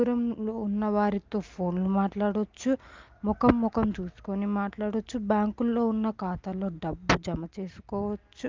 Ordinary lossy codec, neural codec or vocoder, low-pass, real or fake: Opus, 32 kbps; none; 7.2 kHz; real